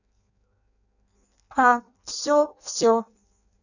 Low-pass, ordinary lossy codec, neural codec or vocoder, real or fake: 7.2 kHz; none; codec, 16 kHz in and 24 kHz out, 0.6 kbps, FireRedTTS-2 codec; fake